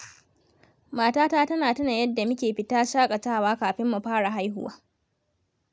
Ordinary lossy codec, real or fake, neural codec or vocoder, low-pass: none; real; none; none